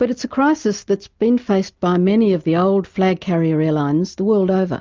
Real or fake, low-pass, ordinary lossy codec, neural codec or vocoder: real; 7.2 kHz; Opus, 24 kbps; none